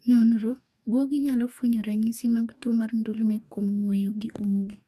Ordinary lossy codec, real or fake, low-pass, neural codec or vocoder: none; fake; 14.4 kHz; codec, 44.1 kHz, 2.6 kbps, DAC